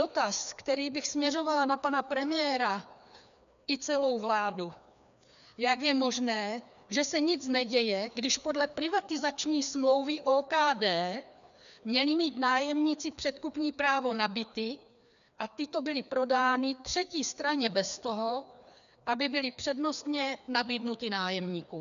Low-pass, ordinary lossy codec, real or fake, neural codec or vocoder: 7.2 kHz; MP3, 96 kbps; fake; codec, 16 kHz, 2 kbps, FreqCodec, larger model